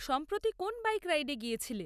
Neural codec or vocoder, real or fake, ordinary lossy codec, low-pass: none; real; none; 14.4 kHz